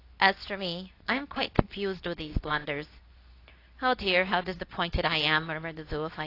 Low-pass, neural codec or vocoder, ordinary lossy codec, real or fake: 5.4 kHz; codec, 24 kHz, 0.9 kbps, WavTokenizer, medium speech release version 2; AAC, 32 kbps; fake